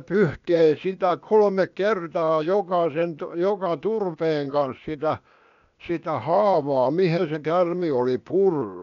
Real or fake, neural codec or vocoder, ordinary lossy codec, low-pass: fake; codec, 16 kHz, 0.8 kbps, ZipCodec; none; 7.2 kHz